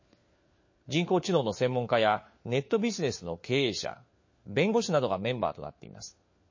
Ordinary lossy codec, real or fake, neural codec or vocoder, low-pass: MP3, 32 kbps; fake; codec, 16 kHz, 4 kbps, FunCodec, trained on LibriTTS, 50 frames a second; 7.2 kHz